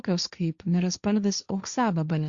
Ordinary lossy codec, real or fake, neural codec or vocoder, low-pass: Opus, 64 kbps; fake; codec, 16 kHz, 1.1 kbps, Voila-Tokenizer; 7.2 kHz